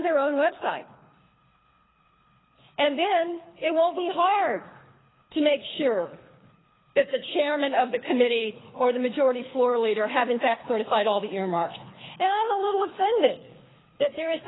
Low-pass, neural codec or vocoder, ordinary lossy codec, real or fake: 7.2 kHz; codec, 24 kHz, 3 kbps, HILCodec; AAC, 16 kbps; fake